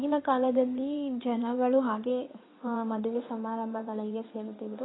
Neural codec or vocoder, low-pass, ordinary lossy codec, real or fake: codec, 16 kHz in and 24 kHz out, 2.2 kbps, FireRedTTS-2 codec; 7.2 kHz; AAC, 16 kbps; fake